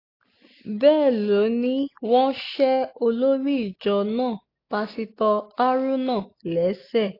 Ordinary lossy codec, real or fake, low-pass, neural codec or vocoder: AAC, 32 kbps; real; 5.4 kHz; none